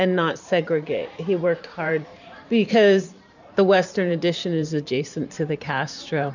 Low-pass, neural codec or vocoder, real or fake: 7.2 kHz; vocoder, 44.1 kHz, 128 mel bands every 512 samples, BigVGAN v2; fake